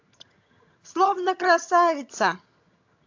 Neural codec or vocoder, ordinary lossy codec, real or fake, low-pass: vocoder, 22.05 kHz, 80 mel bands, HiFi-GAN; AAC, 48 kbps; fake; 7.2 kHz